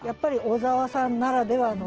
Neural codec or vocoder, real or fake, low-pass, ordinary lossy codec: none; real; 7.2 kHz; Opus, 16 kbps